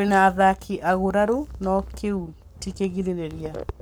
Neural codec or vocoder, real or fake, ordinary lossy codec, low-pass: codec, 44.1 kHz, 7.8 kbps, Pupu-Codec; fake; none; none